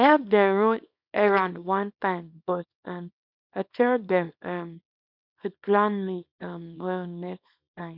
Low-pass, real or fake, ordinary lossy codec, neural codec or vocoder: 5.4 kHz; fake; none; codec, 24 kHz, 0.9 kbps, WavTokenizer, small release